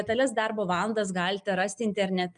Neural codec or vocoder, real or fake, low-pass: none; real; 9.9 kHz